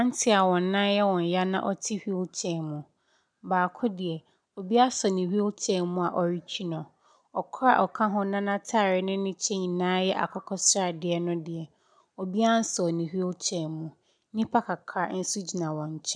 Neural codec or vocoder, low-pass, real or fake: none; 9.9 kHz; real